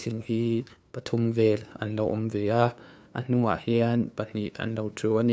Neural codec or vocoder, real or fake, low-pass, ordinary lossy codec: codec, 16 kHz, 2 kbps, FunCodec, trained on LibriTTS, 25 frames a second; fake; none; none